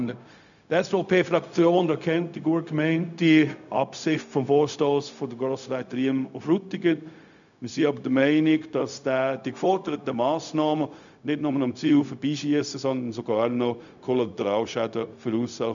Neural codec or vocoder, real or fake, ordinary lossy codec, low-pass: codec, 16 kHz, 0.4 kbps, LongCat-Audio-Codec; fake; none; 7.2 kHz